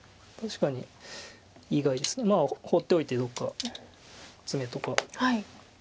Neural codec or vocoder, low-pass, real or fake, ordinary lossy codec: none; none; real; none